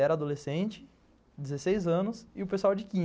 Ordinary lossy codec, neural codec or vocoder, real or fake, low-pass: none; none; real; none